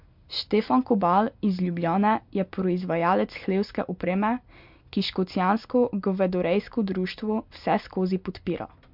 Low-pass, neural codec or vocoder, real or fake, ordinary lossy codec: 5.4 kHz; none; real; MP3, 48 kbps